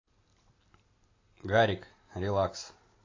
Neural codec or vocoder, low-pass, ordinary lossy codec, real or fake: none; 7.2 kHz; AAC, 32 kbps; real